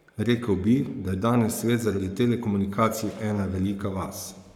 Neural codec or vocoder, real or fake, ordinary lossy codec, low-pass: codec, 44.1 kHz, 7.8 kbps, Pupu-Codec; fake; none; 19.8 kHz